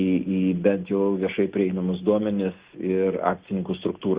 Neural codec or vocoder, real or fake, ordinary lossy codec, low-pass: none; real; Opus, 64 kbps; 3.6 kHz